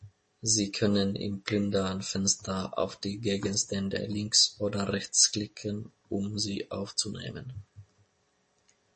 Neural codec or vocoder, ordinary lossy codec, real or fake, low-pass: none; MP3, 32 kbps; real; 10.8 kHz